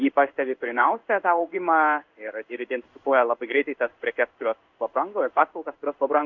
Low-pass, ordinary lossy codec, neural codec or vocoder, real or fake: 7.2 kHz; Opus, 64 kbps; codec, 16 kHz in and 24 kHz out, 1 kbps, XY-Tokenizer; fake